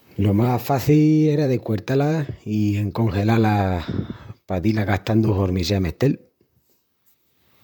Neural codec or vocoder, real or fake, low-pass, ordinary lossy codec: vocoder, 44.1 kHz, 128 mel bands, Pupu-Vocoder; fake; 19.8 kHz; MP3, 96 kbps